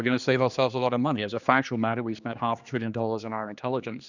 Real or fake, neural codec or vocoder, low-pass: fake; codec, 16 kHz, 2 kbps, X-Codec, HuBERT features, trained on general audio; 7.2 kHz